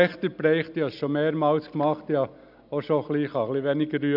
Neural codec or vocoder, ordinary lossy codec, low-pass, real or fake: none; none; 5.4 kHz; real